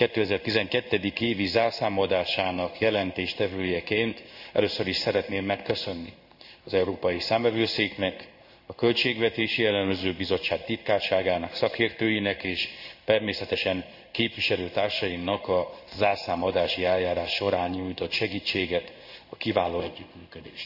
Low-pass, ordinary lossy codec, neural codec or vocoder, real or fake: 5.4 kHz; none; codec, 16 kHz in and 24 kHz out, 1 kbps, XY-Tokenizer; fake